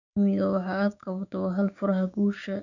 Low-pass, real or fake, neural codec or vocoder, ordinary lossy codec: 7.2 kHz; fake; codec, 16 kHz, 6 kbps, DAC; none